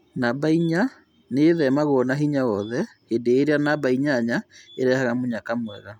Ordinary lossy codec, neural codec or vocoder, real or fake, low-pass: none; none; real; 19.8 kHz